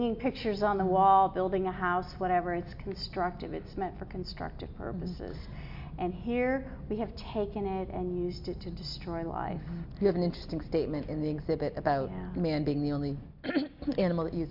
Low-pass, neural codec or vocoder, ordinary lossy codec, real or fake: 5.4 kHz; none; MP3, 48 kbps; real